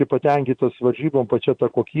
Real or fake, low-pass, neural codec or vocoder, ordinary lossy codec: real; 9.9 kHz; none; MP3, 64 kbps